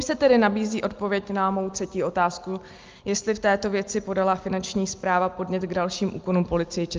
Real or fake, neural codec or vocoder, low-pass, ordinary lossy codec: real; none; 7.2 kHz; Opus, 32 kbps